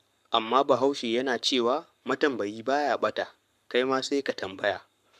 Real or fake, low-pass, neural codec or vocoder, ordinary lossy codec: fake; 14.4 kHz; codec, 44.1 kHz, 7.8 kbps, Pupu-Codec; none